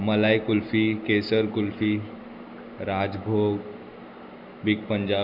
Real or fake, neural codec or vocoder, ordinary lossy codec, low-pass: real; none; none; 5.4 kHz